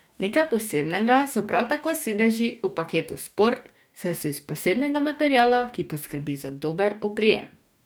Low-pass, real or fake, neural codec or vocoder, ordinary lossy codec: none; fake; codec, 44.1 kHz, 2.6 kbps, DAC; none